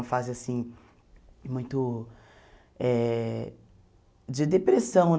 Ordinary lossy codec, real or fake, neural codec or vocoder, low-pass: none; real; none; none